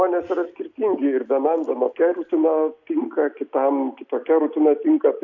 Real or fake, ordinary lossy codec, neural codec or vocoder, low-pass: real; AAC, 48 kbps; none; 7.2 kHz